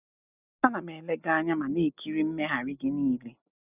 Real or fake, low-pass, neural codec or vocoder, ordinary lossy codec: real; 3.6 kHz; none; none